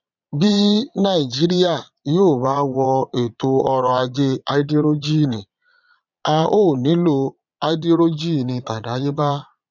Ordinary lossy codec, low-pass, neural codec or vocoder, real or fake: none; 7.2 kHz; vocoder, 22.05 kHz, 80 mel bands, Vocos; fake